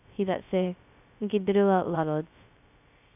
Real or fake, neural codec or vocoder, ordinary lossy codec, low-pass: fake; codec, 16 kHz, 0.2 kbps, FocalCodec; none; 3.6 kHz